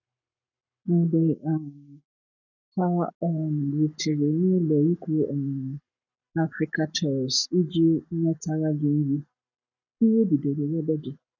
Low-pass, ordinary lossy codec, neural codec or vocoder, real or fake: 7.2 kHz; none; codec, 44.1 kHz, 7.8 kbps, Pupu-Codec; fake